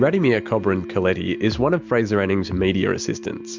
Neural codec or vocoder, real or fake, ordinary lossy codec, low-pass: none; real; MP3, 64 kbps; 7.2 kHz